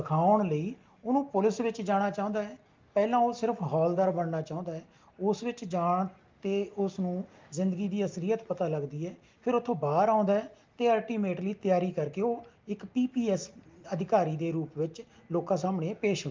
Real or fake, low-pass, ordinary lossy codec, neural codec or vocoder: real; 7.2 kHz; Opus, 32 kbps; none